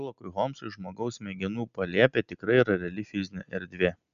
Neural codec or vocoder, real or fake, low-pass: none; real; 7.2 kHz